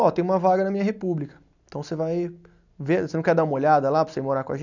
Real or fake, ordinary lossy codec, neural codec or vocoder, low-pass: real; none; none; 7.2 kHz